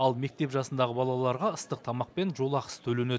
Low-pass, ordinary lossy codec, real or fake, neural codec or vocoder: none; none; real; none